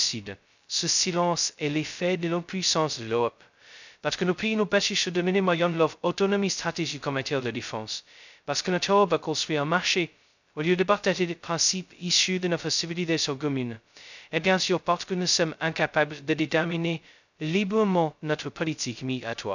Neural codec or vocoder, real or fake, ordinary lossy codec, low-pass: codec, 16 kHz, 0.2 kbps, FocalCodec; fake; none; 7.2 kHz